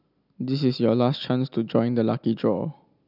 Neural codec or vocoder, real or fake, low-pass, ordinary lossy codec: none; real; 5.4 kHz; none